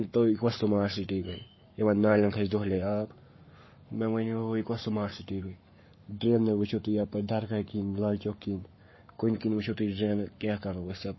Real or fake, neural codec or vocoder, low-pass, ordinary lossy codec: fake; codec, 44.1 kHz, 7.8 kbps, Pupu-Codec; 7.2 kHz; MP3, 24 kbps